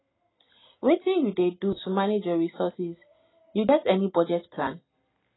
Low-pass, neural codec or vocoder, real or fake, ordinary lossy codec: 7.2 kHz; none; real; AAC, 16 kbps